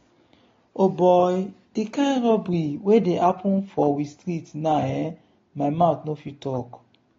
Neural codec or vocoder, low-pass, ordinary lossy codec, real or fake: none; 7.2 kHz; AAC, 32 kbps; real